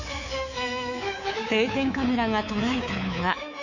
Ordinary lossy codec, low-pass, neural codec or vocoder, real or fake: none; 7.2 kHz; codec, 24 kHz, 3.1 kbps, DualCodec; fake